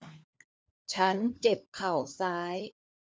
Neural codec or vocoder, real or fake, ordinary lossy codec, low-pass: codec, 16 kHz, 4 kbps, FunCodec, trained on LibriTTS, 50 frames a second; fake; none; none